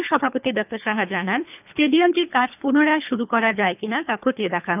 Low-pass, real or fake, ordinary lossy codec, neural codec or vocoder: 3.6 kHz; fake; none; codec, 24 kHz, 3 kbps, HILCodec